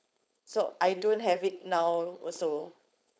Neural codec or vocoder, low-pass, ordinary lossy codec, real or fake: codec, 16 kHz, 4.8 kbps, FACodec; none; none; fake